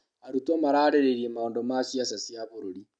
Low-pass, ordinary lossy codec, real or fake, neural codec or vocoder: 9.9 kHz; none; real; none